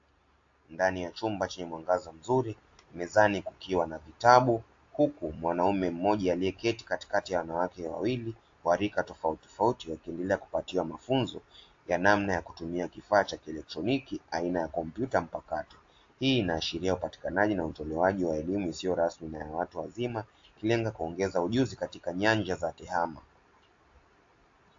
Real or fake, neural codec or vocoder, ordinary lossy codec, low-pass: real; none; AAC, 48 kbps; 7.2 kHz